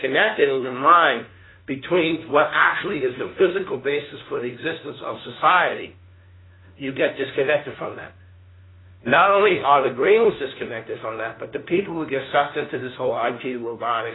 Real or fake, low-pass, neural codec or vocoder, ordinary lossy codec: fake; 7.2 kHz; codec, 16 kHz, 1 kbps, FunCodec, trained on LibriTTS, 50 frames a second; AAC, 16 kbps